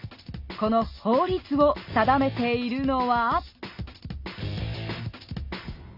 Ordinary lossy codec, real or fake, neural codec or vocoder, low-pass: none; real; none; 5.4 kHz